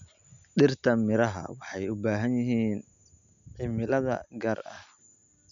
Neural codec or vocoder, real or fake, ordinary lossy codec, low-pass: none; real; none; 7.2 kHz